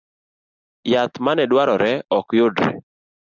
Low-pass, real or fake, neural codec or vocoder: 7.2 kHz; real; none